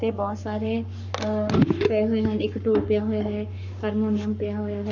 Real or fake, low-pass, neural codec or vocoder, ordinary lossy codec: fake; 7.2 kHz; codec, 44.1 kHz, 7.8 kbps, Pupu-Codec; none